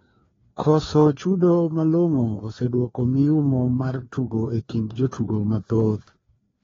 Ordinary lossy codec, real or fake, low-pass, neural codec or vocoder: AAC, 24 kbps; fake; 7.2 kHz; codec, 16 kHz, 2 kbps, FreqCodec, larger model